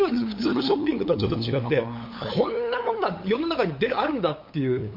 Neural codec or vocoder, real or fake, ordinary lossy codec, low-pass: codec, 16 kHz, 8 kbps, FunCodec, trained on LibriTTS, 25 frames a second; fake; MP3, 32 kbps; 5.4 kHz